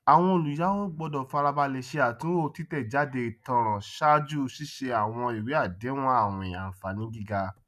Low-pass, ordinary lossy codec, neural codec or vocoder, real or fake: 14.4 kHz; none; none; real